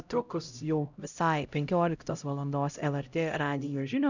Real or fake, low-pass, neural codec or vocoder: fake; 7.2 kHz; codec, 16 kHz, 0.5 kbps, X-Codec, HuBERT features, trained on LibriSpeech